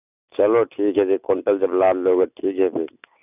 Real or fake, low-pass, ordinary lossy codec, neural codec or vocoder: real; 3.6 kHz; none; none